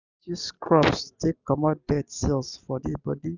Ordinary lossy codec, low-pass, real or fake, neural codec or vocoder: none; 7.2 kHz; real; none